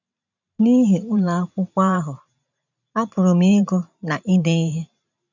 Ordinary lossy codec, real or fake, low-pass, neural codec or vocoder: none; fake; 7.2 kHz; vocoder, 44.1 kHz, 128 mel bands every 512 samples, BigVGAN v2